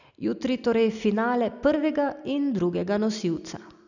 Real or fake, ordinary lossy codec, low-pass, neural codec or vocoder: real; none; 7.2 kHz; none